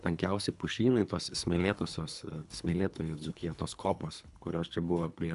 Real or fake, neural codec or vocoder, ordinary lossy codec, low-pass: fake; codec, 24 kHz, 3 kbps, HILCodec; MP3, 96 kbps; 10.8 kHz